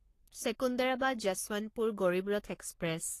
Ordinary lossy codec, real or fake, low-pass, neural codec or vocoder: AAC, 48 kbps; fake; 14.4 kHz; codec, 44.1 kHz, 3.4 kbps, Pupu-Codec